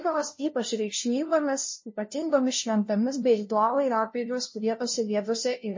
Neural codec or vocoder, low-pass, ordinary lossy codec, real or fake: codec, 16 kHz, 0.5 kbps, FunCodec, trained on LibriTTS, 25 frames a second; 7.2 kHz; MP3, 32 kbps; fake